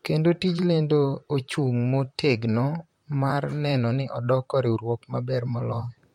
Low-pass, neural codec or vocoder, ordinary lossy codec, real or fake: 19.8 kHz; vocoder, 44.1 kHz, 128 mel bands, Pupu-Vocoder; MP3, 64 kbps; fake